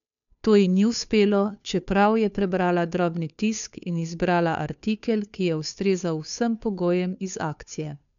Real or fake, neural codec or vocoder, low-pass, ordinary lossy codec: fake; codec, 16 kHz, 2 kbps, FunCodec, trained on Chinese and English, 25 frames a second; 7.2 kHz; none